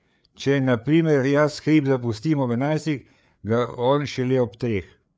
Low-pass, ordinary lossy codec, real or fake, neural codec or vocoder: none; none; fake; codec, 16 kHz, 4 kbps, FreqCodec, larger model